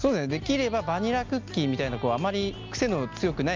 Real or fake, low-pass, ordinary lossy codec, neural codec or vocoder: real; 7.2 kHz; Opus, 24 kbps; none